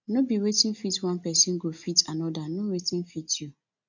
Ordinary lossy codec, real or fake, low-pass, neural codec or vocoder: none; real; 7.2 kHz; none